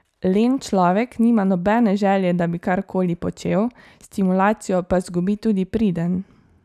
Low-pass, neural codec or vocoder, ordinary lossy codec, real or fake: 14.4 kHz; none; none; real